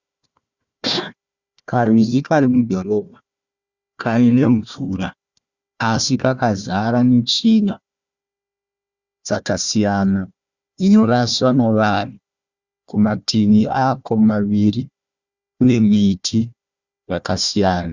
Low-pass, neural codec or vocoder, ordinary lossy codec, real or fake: 7.2 kHz; codec, 16 kHz, 1 kbps, FunCodec, trained on Chinese and English, 50 frames a second; Opus, 64 kbps; fake